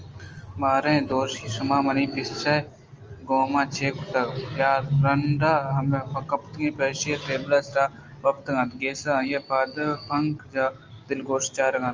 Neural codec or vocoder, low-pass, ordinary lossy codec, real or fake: none; 7.2 kHz; Opus, 24 kbps; real